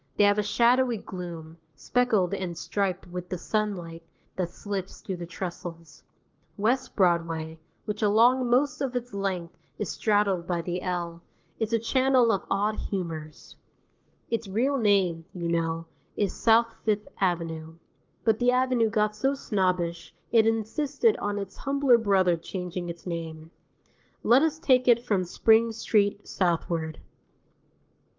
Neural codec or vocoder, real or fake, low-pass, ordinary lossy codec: codec, 44.1 kHz, 7.8 kbps, Pupu-Codec; fake; 7.2 kHz; Opus, 32 kbps